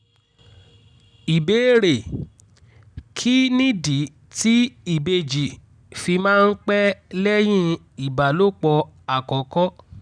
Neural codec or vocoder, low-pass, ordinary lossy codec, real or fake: none; 9.9 kHz; none; real